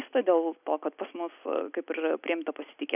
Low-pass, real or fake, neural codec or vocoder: 3.6 kHz; real; none